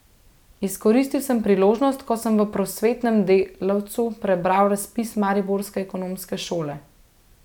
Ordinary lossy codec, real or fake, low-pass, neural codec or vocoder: none; real; 19.8 kHz; none